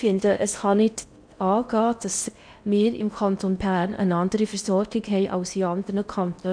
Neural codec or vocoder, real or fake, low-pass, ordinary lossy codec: codec, 16 kHz in and 24 kHz out, 0.6 kbps, FocalCodec, streaming, 4096 codes; fake; 9.9 kHz; none